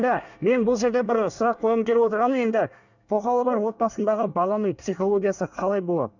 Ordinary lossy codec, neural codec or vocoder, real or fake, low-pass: none; codec, 24 kHz, 1 kbps, SNAC; fake; 7.2 kHz